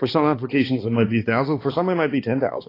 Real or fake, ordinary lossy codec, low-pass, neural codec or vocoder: fake; AAC, 24 kbps; 5.4 kHz; codec, 16 kHz, 2 kbps, X-Codec, HuBERT features, trained on balanced general audio